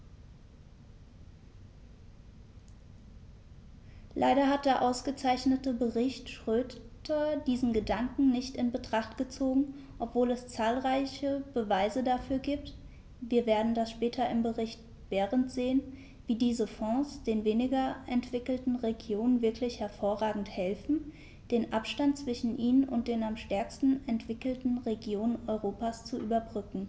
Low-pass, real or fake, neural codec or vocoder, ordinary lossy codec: none; real; none; none